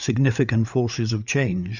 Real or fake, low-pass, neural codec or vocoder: fake; 7.2 kHz; codec, 16 kHz, 8 kbps, FreqCodec, larger model